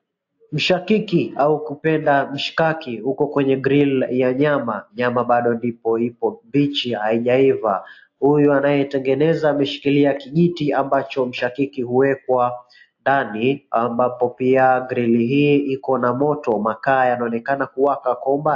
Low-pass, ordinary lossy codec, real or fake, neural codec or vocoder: 7.2 kHz; AAC, 48 kbps; real; none